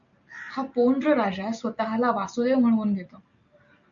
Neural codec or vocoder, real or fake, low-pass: none; real; 7.2 kHz